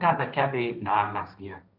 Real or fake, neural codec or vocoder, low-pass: fake; codec, 16 kHz, 1.1 kbps, Voila-Tokenizer; 5.4 kHz